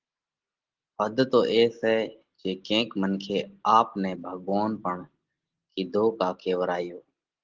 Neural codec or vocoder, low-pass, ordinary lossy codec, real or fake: none; 7.2 kHz; Opus, 16 kbps; real